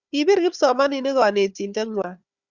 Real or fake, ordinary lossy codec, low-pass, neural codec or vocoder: fake; Opus, 64 kbps; 7.2 kHz; codec, 16 kHz, 4 kbps, FunCodec, trained on Chinese and English, 50 frames a second